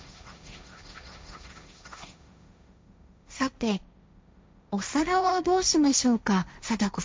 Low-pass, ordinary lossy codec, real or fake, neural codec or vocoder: none; none; fake; codec, 16 kHz, 1.1 kbps, Voila-Tokenizer